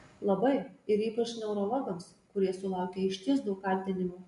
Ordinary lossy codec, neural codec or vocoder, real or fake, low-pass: MP3, 64 kbps; none; real; 10.8 kHz